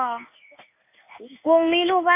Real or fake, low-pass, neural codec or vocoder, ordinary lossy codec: fake; 3.6 kHz; codec, 16 kHz in and 24 kHz out, 1 kbps, XY-Tokenizer; none